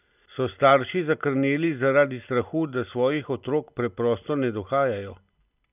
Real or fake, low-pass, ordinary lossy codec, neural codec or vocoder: real; 3.6 kHz; none; none